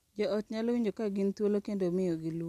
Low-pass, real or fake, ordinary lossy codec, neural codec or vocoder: 14.4 kHz; real; none; none